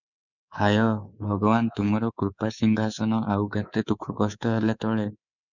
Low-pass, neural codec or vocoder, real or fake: 7.2 kHz; codec, 24 kHz, 3.1 kbps, DualCodec; fake